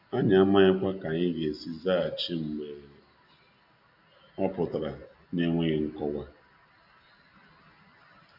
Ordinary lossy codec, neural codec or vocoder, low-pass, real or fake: none; none; 5.4 kHz; real